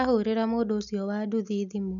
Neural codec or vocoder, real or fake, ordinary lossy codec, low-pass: none; real; none; 7.2 kHz